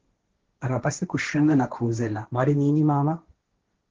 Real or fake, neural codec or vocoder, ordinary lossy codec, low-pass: fake; codec, 16 kHz, 1.1 kbps, Voila-Tokenizer; Opus, 16 kbps; 7.2 kHz